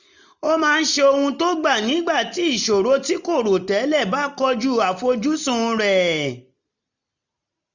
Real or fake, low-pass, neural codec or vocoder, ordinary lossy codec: real; 7.2 kHz; none; none